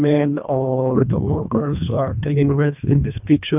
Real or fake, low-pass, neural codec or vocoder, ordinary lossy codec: fake; 3.6 kHz; codec, 24 kHz, 1.5 kbps, HILCodec; none